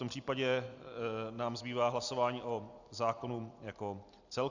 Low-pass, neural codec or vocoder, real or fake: 7.2 kHz; none; real